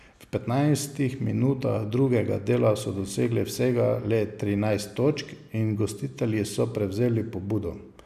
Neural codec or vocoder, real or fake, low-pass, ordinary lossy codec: none; real; 14.4 kHz; none